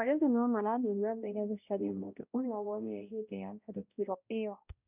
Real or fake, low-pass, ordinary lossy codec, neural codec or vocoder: fake; 3.6 kHz; none; codec, 16 kHz, 0.5 kbps, X-Codec, HuBERT features, trained on balanced general audio